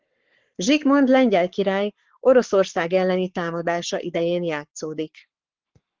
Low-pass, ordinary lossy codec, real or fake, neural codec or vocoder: 7.2 kHz; Opus, 16 kbps; fake; codec, 44.1 kHz, 7.8 kbps, Pupu-Codec